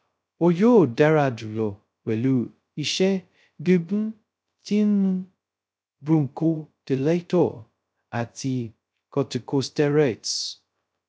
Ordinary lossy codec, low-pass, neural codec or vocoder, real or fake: none; none; codec, 16 kHz, 0.2 kbps, FocalCodec; fake